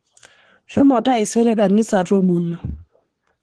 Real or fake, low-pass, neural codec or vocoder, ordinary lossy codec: fake; 10.8 kHz; codec, 24 kHz, 1 kbps, SNAC; Opus, 16 kbps